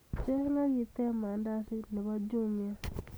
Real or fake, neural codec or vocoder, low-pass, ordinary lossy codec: real; none; none; none